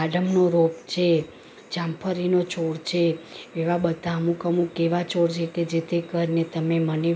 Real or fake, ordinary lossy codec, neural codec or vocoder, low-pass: real; none; none; none